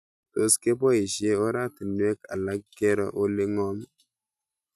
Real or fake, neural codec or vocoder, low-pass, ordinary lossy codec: real; none; 14.4 kHz; none